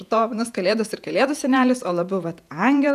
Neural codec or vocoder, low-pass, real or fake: vocoder, 48 kHz, 128 mel bands, Vocos; 14.4 kHz; fake